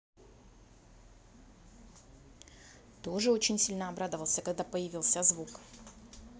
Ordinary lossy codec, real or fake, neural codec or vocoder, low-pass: none; real; none; none